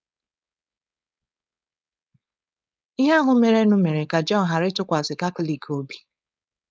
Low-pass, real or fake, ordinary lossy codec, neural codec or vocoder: none; fake; none; codec, 16 kHz, 4.8 kbps, FACodec